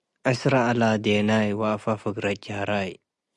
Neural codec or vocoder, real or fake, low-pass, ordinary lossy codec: none; real; 10.8 kHz; Opus, 64 kbps